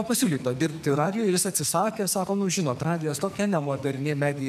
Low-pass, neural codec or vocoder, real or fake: 14.4 kHz; codec, 44.1 kHz, 2.6 kbps, SNAC; fake